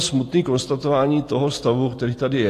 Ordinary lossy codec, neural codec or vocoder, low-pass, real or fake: AAC, 48 kbps; none; 14.4 kHz; real